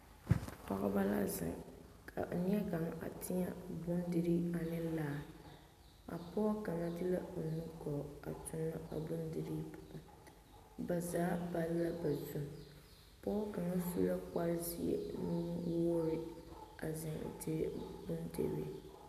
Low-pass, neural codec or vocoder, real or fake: 14.4 kHz; none; real